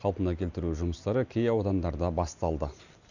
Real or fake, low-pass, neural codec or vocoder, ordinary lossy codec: real; 7.2 kHz; none; none